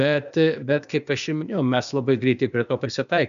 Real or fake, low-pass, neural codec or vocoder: fake; 7.2 kHz; codec, 16 kHz, 0.8 kbps, ZipCodec